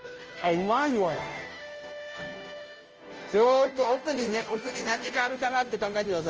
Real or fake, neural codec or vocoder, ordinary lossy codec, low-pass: fake; codec, 16 kHz, 0.5 kbps, FunCodec, trained on Chinese and English, 25 frames a second; Opus, 24 kbps; 7.2 kHz